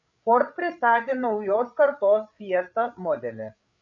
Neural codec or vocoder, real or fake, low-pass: codec, 16 kHz, 8 kbps, FreqCodec, larger model; fake; 7.2 kHz